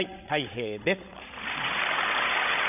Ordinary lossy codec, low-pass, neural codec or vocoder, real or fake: none; 3.6 kHz; codec, 16 kHz, 16 kbps, FreqCodec, larger model; fake